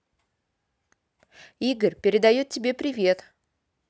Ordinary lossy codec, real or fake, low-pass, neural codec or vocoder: none; real; none; none